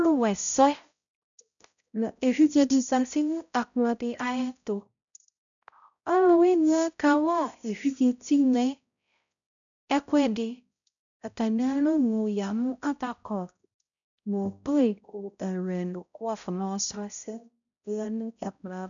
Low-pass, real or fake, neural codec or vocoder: 7.2 kHz; fake; codec, 16 kHz, 0.5 kbps, X-Codec, HuBERT features, trained on balanced general audio